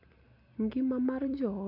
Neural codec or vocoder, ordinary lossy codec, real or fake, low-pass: none; none; real; 5.4 kHz